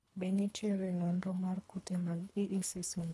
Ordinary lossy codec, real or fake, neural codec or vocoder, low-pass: none; fake; codec, 24 kHz, 3 kbps, HILCodec; none